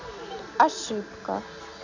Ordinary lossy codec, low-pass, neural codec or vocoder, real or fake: none; 7.2 kHz; none; real